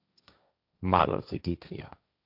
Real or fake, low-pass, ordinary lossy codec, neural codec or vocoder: fake; 5.4 kHz; AAC, 48 kbps; codec, 16 kHz, 1.1 kbps, Voila-Tokenizer